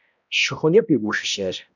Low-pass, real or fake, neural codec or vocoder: 7.2 kHz; fake; codec, 16 kHz, 0.5 kbps, X-Codec, HuBERT features, trained on balanced general audio